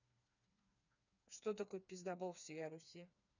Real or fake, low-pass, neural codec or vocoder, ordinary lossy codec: fake; 7.2 kHz; codec, 16 kHz, 4 kbps, FreqCodec, smaller model; none